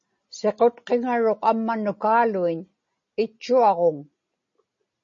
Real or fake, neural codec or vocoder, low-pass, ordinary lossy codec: real; none; 7.2 kHz; MP3, 32 kbps